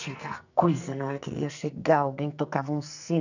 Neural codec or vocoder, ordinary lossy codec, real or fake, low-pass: codec, 44.1 kHz, 2.6 kbps, SNAC; none; fake; 7.2 kHz